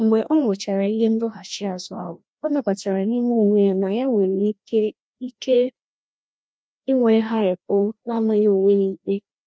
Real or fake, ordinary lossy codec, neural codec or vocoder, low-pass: fake; none; codec, 16 kHz, 1 kbps, FreqCodec, larger model; none